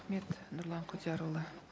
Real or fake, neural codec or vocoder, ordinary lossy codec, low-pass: real; none; none; none